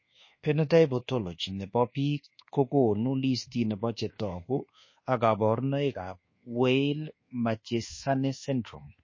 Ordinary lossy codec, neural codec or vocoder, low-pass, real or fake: MP3, 32 kbps; codec, 24 kHz, 1.2 kbps, DualCodec; 7.2 kHz; fake